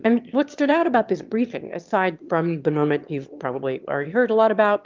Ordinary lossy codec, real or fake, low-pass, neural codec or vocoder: Opus, 24 kbps; fake; 7.2 kHz; autoencoder, 22.05 kHz, a latent of 192 numbers a frame, VITS, trained on one speaker